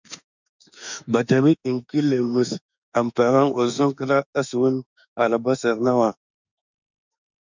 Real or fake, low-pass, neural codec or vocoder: fake; 7.2 kHz; autoencoder, 48 kHz, 32 numbers a frame, DAC-VAE, trained on Japanese speech